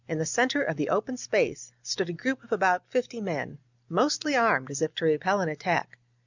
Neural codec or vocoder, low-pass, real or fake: none; 7.2 kHz; real